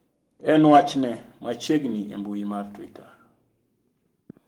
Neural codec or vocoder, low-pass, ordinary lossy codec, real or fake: codec, 44.1 kHz, 7.8 kbps, Pupu-Codec; 19.8 kHz; Opus, 24 kbps; fake